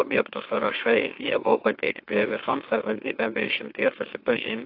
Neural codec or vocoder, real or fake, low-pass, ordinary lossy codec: autoencoder, 44.1 kHz, a latent of 192 numbers a frame, MeloTTS; fake; 5.4 kHz; AAC, 32 kbps